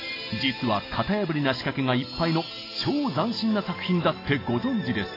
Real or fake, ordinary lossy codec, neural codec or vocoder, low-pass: real; AAC, 24 kbps; none; 5.4 kHz